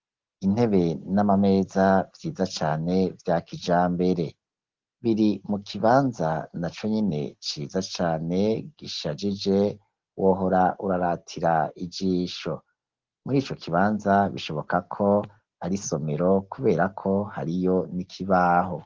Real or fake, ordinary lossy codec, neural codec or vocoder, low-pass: real; Opus, 16 kbps; none; 7.2 kHz